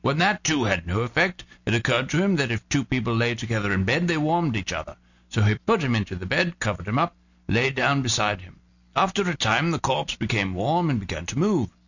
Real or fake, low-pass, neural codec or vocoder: real; 7.2 kHz; none